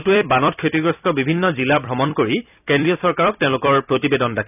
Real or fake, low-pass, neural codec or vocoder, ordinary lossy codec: fake; 3.6 kHz; vocoder, 44.1 kHz, 128 mel bands every 256 samples, BigVGAN v2; none